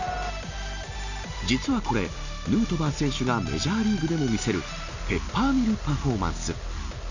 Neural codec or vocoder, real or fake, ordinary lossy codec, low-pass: none; real; none; 7.2 kHz